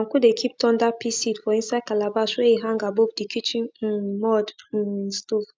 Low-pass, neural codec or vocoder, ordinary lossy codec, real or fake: none; none; none; real